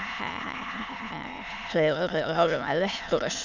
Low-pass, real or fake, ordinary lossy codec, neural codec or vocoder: 7.2 kHz; fake; none; autoencoder, 22.05 kHz, a latent of 192 numbers a frame, VITS, trained on many speakers